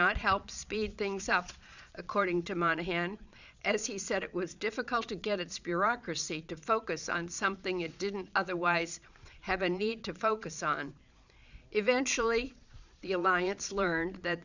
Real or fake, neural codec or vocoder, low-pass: fake; vocoder, 22.05 kHz, 80 mel bands, Vocos; 7.2 kHz